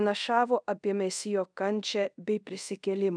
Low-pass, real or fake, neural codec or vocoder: 9.9 kHz; fake; codec, 24 kHz, 0.5 kbps, DualCodec